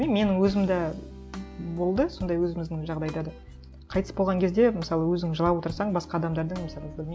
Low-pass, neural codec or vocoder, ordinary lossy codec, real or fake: none; none; none; real